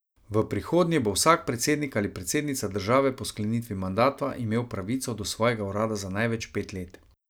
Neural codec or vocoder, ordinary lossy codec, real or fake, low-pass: none; none; real; none